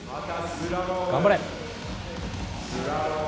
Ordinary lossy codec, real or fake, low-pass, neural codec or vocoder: none; real; none; none